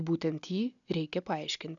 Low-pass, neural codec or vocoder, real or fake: 7.2 kHz; none; real